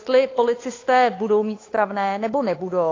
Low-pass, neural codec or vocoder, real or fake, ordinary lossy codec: 7.2 kHz; codec, 16 kHz, 8 kbps, FunCodec, trained on Chinese and English, 25 frames a second; fake; AAC, 32 kbps